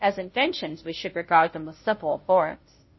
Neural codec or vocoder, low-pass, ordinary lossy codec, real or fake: codec, 16 kHz, 0.5 kbps, FunCodec, trained on Chinese and English, 25 frames a second; 7.2 kHz; MP3, 24 kbps; fake